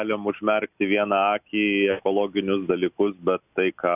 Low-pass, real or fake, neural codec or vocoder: 3.6 kHz; real; none